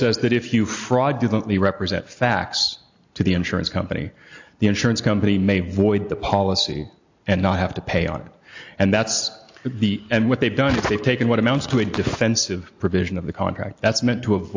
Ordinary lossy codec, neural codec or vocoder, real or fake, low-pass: AAC, 48 kbps; none; real; 7.2 kHz